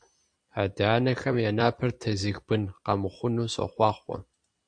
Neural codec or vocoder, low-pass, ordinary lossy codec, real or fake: vocoder, 44.1 kHz, 128 mel bands every 256 samples, BigVGAN v2; 9.9 kHz; AAC, 64 kbps; fake